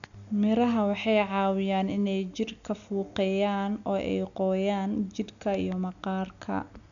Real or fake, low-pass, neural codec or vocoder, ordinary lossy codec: real; 7.2 kHz; none; none